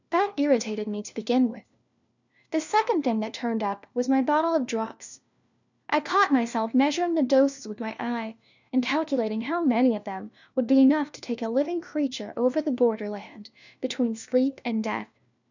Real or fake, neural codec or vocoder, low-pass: fake; codec, 16 kHz, 1 kbps, FunCodec, trained on LibriTTS, 50 frames a second; 7.2 kHz